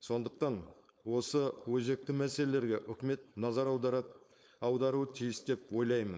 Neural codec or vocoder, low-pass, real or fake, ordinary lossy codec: codec, 16 kHz, 4.8 kbps, FACodec; none; fake; none